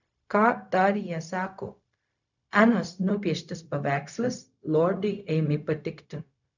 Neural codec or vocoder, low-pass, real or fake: codec, 16 kHz, 0.4 kbps, LongCat-Audio-Codec; 7.2 kHz; fake